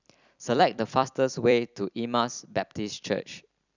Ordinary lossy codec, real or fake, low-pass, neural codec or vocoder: none; fake; 7.2 kHz; vocoder, 44.1 kHz, 128 mel bands every 256 samples, BigVGAN v2